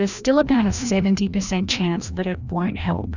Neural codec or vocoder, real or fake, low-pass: codec, 16 kHz, 1 kbps, FreqCodec, larger model; fake; 7.2 kHz